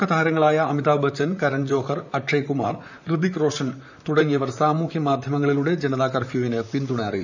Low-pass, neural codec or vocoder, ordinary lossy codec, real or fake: 7.2 kHz; vocoder, 44.1 kHz, 128 mel bands, Pupu-Vocoder; none; fake